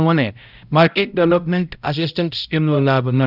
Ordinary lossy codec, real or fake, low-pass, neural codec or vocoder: none; fake; 5.4 kHz; codec, 16 kHz, 0.5 kbps, X-Codec, HuBERT features, trained on balanced general audio